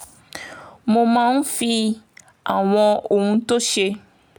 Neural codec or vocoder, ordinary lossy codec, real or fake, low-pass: none; none; real; none